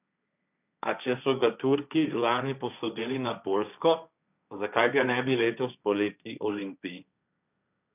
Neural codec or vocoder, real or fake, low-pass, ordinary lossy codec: codec, 16 kHz, 1.1 kbps, Voila-Tokenizer; fake; 3.6 kHz; none